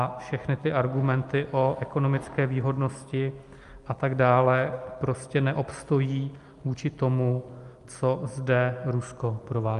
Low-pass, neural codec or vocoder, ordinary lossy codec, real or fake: 10.8 kHz; none; Opus, 32 kbps; real